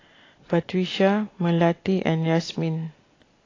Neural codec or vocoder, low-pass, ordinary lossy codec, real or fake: none; 7.2 kHz; AAC, 32 kbps; real